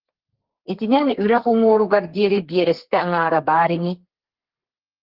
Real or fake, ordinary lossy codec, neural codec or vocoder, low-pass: fake; Opus, 16 kbps; codec, 32 kHz, 1.9 kbps, SNAC; 5.4 kHz